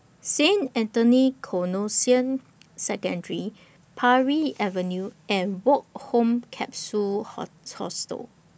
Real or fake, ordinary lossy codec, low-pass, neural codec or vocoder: real; none; none; none